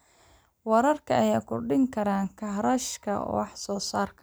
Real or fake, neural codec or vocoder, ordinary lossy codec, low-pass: real; none; none; none